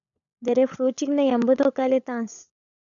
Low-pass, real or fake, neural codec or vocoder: 7.2 kHz; fake; codec, 16 kHz, 16 kbps, FunCodec, trained on LibriTTS, 50 frames a second